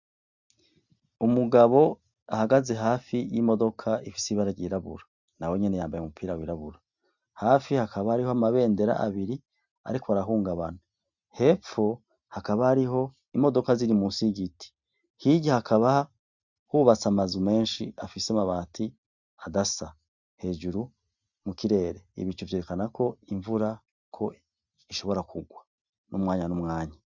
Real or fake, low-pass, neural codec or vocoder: real; 7.2 kHz; none